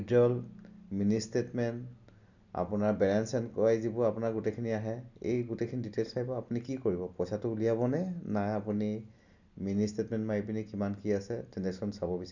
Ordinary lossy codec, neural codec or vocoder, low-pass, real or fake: none; none; 7.2 kHz; real